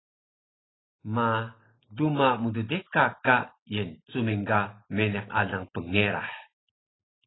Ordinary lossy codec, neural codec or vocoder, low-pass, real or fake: AAC, 16 kbps; none; 7.2 kHz; real